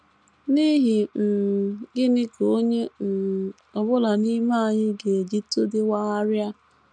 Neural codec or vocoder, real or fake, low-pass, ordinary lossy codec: none; real; 9.9 kHz; none